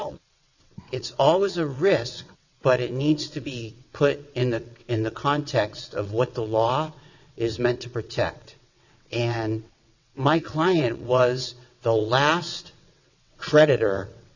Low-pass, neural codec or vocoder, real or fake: 7.2 kHz; vocoder, 22.05 kHz, 80 mel bands, WaveNeXt; fake